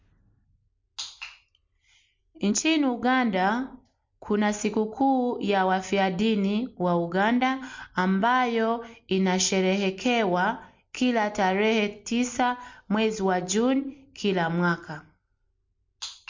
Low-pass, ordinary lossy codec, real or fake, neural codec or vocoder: 7.2 kHz; MP3, 48 kbps; real; none